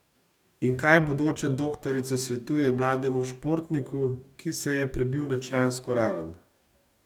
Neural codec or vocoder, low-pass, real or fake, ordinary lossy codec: codec, 44.1 kHz, 2.6 kbps, DAC; 19.8 kHz; fake; none